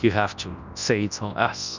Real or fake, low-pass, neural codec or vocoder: fake; 7.2 kHz; codec, 24 kHz, 0.9 kbps, WavTokenizer, large speech release